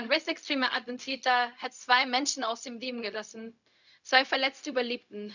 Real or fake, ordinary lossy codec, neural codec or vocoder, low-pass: fake; none; codec, 16 kHz, 0.4 kbps, LongCat-Audio-Codec; 7.2 kHz